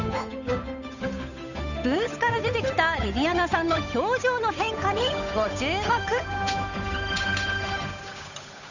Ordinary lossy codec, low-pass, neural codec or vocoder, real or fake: none; 7.2 kHz; codec, 16 kHz, 8 kbps, FunCodec, trained on Chinese and English, 25 frames a second; fake